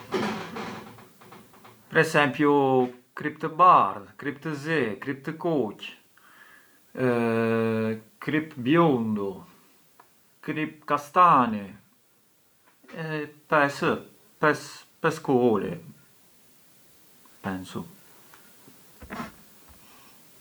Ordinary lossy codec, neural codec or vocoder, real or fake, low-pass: none; none; real; none